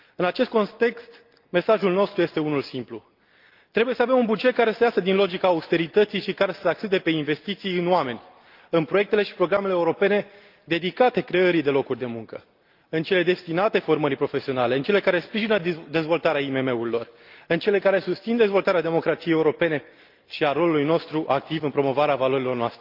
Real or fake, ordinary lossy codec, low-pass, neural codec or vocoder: real; Opus, 24 kbps; 5.4 kHz; none